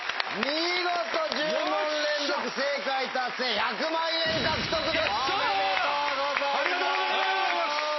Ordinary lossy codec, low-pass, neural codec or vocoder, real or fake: MP3, 24 kbps; 7.2 kHz; none; real